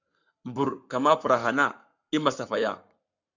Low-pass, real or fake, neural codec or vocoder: 7.2 kHz; fake; codec, 44.1 kHz, 7.8 kbps, Pupu-Codec